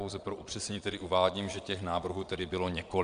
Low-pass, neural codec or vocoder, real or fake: 9.9 kHz; vocoder, 22.05 kHz, 80 mel bands, Vocos; fake